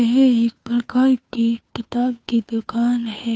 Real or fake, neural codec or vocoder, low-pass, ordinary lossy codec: fake; codec, 16 kHz, 2 kbps, FunCodec, trained on Chinese and English, 25 frames a second; none; none